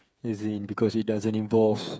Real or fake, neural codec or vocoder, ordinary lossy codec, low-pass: fake; codec, 16 kHz, 8 kbps, FreqCodec, smaller model; none; none